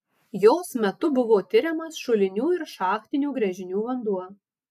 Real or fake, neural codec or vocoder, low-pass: real; none; 14.4 kHz